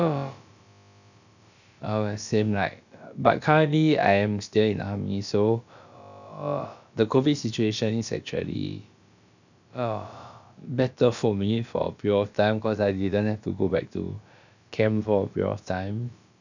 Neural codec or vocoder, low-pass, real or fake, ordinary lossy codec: codec, 16 kHz, about 1 kbps, DyCAST, with the encoder's durations; 7.2 kHz; fake; none